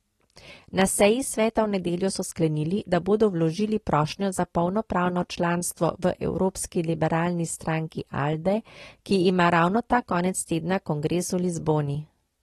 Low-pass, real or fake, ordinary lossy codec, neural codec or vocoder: 19.8 kHz; real; AAC, 32 kbps; none